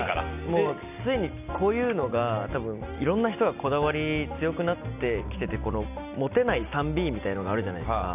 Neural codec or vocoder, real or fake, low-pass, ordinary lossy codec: none; real; 3.6 kHz; none